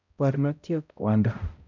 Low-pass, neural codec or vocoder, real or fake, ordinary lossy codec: 7.2 kHz; codec, 16 kHz, 0.5 kbps, X-Codec, HuBERT features, trained on balanced general audio; fake; none